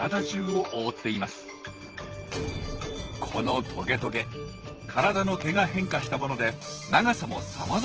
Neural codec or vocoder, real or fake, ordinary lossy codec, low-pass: vocoder, 44.1 kHz, 128 mel bands, Pupu-Vocoder; fake; Opus, 16 kbps; 7.2 kHz